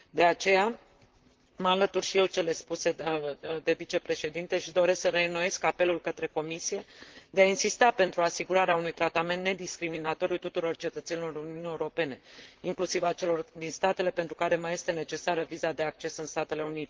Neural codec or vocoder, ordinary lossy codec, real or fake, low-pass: vocoder, 44.1 kHz, 128 mel bands, Pupu-Vocoder; Opus, 16 kbps; fake; 7.2 kHz